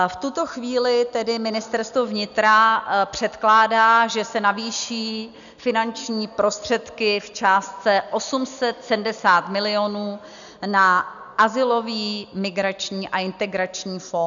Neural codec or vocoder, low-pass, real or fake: none; 7.2 kHz; real